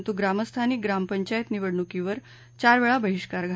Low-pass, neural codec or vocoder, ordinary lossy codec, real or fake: none; none; none; real